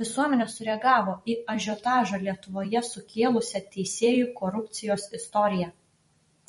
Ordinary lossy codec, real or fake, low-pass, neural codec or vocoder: MP3, 48 kbps; fake; 19.8 kHz; vocoder, 44.1 kHz, 128 mel bands every 256 samples, BigVGAN v2